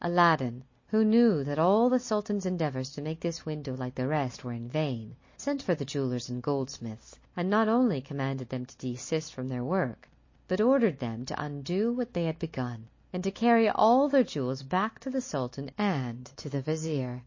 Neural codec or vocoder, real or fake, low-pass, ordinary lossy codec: none; real; 7.2 kHz; MP3, 32 kbps